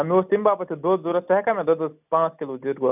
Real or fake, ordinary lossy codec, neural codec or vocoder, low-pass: real; none; none; 3.6 kHz